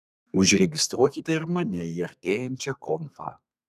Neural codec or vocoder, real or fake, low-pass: codec, 32 kHz, 1.9 kbps, SNAC; fake; 14.4 kHz